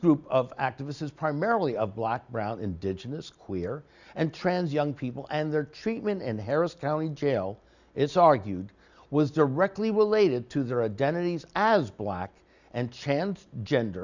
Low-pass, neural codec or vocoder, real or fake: 7.2 kHz; none; real